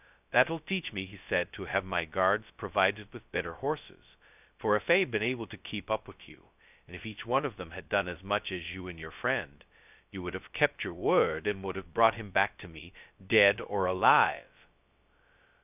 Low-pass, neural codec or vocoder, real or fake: 3.6 kHz; codec, 16 kHz, 0.2 kbps, FocalCodec; fake